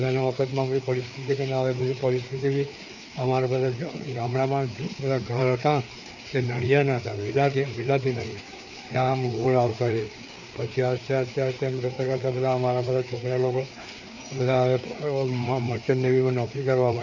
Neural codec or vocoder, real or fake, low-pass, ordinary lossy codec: codec, 16 kHz, 4 kbps, FreqCodec, larger model; fake; 7.2 kHz; none